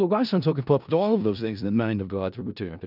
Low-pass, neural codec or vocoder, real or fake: 5.4 kHz; codec, 16 kHz in and 24 kHz out, 0.4 kbps, LongCat-Audio-Codec, four codebook decoder; fake